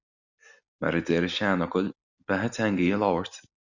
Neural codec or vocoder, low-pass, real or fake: none; 7.2 kHz; real